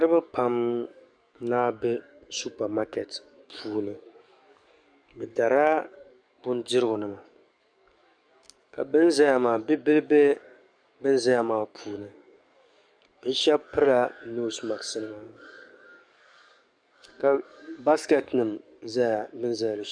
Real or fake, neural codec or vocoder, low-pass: fake; codec, 44.1 kHz, 7.8 kbps, DAC; 9.9 kHz